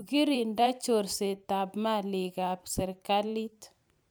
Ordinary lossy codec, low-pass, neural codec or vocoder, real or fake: none; none; vocoder, 44.1 kHz, 128 mel bands every 512 samples, BigVGAN v2; fake